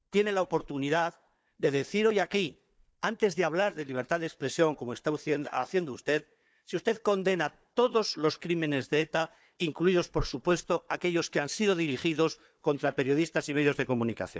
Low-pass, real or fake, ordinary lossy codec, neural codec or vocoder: none; fake; none; codec, 16 kHz, 4 kbps, FunCodec, trained on Chinese and English, 50 frames a second